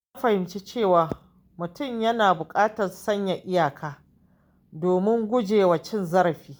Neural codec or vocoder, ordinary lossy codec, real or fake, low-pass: none; none; real; none